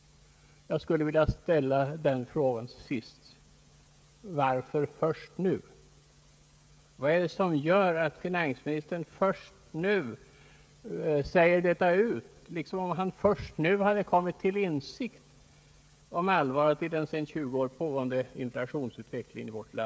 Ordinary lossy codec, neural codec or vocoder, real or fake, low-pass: none; codec, 16 kHz, 16 kbps, FreqCodec, smaller model; fake; none